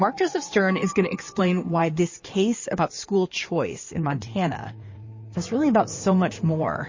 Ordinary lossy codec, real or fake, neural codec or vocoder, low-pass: MP3, 32 kbps; fake; codec, 16 kHz in and 24 kHz out, 2.2 kbps, FireRedTTS-2 codec; 7.2 kHz